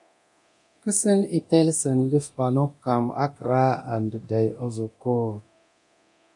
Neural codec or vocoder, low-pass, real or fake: codec, 24 kHz, 0.9 kbps, DualCodec; 10.8 kHz; fake